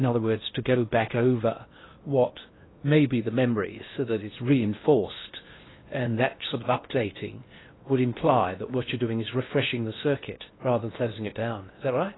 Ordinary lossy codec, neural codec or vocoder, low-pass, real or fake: AAC, 16 kbps; codec, 16 kHz in and 24 kHz out, 0.8 kbps, FocalCodec, streaming, 65536 codes; 7.2 kHz; fake